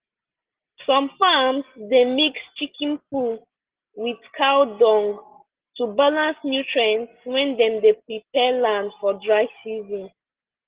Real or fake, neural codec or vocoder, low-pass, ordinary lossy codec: real; none; 3.6 kHz; Opus, 16 kbps